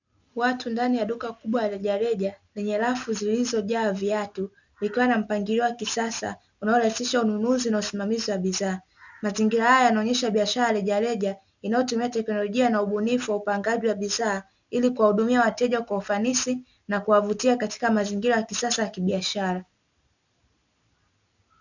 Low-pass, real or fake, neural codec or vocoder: 7.2 kHz; real; none